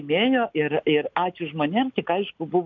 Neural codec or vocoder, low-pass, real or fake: none; 7.2 kHz; real